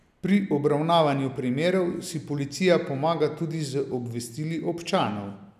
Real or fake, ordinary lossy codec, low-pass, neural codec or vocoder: real; none; 14.4 kHz; none